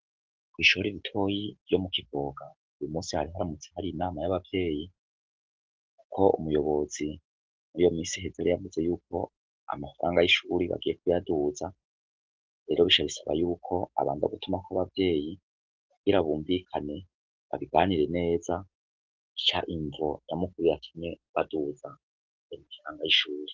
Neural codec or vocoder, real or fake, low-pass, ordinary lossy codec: none; real; 7.2 kHz; Opus, 16 kbps